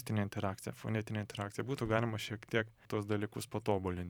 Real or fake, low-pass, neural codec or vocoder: fake; 19.8 kHz; vocoder, 44.1 kHz, 128 mel bands every 512 samples, BigVGAN v2